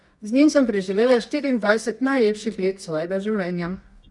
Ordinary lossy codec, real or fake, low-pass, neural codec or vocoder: none; fake; 10.8 kHz; codec, 24 kHz, 0.9 kbps, WavTokenizer, medium music audio release